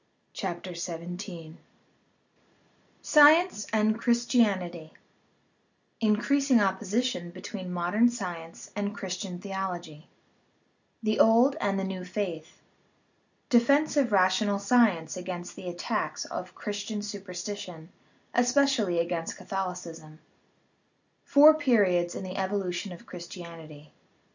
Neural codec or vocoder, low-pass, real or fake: none; 7.2 kHz; real